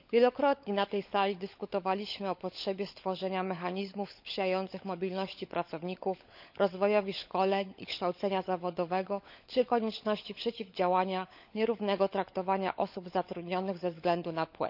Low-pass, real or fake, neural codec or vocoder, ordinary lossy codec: 5.4 kHz; fake; codec, 16 kHz, 16 kbps, FunCodec, trained on LibriTTS, 50 frames a second; none